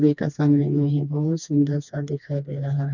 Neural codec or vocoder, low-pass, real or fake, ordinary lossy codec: codec, 16 kHz, 2 kbps, FreqCodec, smaller model; 7.2 kHz; fake; none